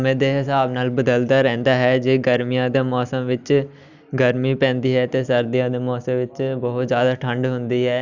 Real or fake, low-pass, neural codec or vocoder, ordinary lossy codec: real; 7.2 kHz; none; none